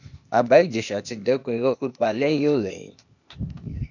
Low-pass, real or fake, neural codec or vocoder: 7.2 kHz; fake; codec, 16 kHz, 0.8 kbps, ZipCodec